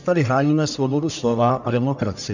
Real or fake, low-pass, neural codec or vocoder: fake; 7.2 kHz; codec, 44.1 kHz, 1.7 kbps, Pupu-Codec